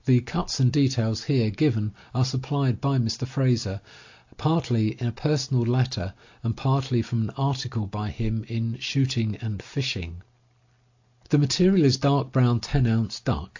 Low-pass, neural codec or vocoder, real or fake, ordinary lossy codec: 7.2 kHz; none; real; AAC, 48 kbps